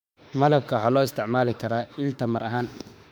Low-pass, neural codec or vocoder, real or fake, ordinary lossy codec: 19.8 kHz; autoencoder, 48 kHz, 32 numbers a frame, DAC-VAE, trained on Japanese speech; fake; none